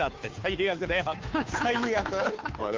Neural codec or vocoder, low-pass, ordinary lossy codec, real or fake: codec, 16 kHz, 2 kbps, FunCodec, trained on Chinese and English, 25 frames a second; 7.2 kHz; Opus, 24 kbps; fake